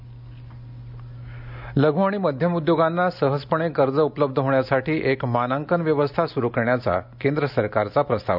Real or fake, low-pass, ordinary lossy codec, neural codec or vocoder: real; 5.4 kHz; none; none